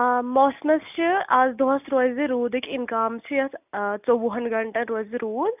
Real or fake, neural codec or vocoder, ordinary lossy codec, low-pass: real; none; none; 3.6 kHz